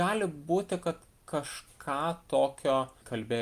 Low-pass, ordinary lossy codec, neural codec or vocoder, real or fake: 14.4 kHz; Opus, 32 kbps; none; real